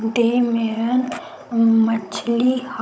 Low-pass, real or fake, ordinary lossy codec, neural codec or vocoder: none; fake; none; codec, 16 kHz, 16 kbps, FunCodec, trained on Chinese and English, 50 frames a second